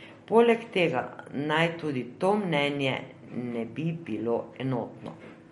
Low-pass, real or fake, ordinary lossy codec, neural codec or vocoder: 19.8 kHz; real; MP3, 48 kbps; none